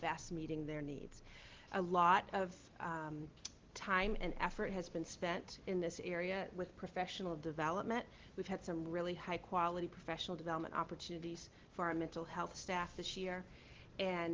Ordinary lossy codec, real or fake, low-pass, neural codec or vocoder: Opus, 16 kbps; real; 7.2 kHz; none